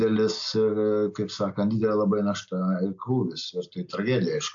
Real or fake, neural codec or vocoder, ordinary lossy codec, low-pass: real; none; AAC, 64 kbps; 7.2 kHz